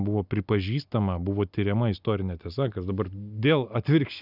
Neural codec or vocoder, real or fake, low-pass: none; real; 5.4 kHz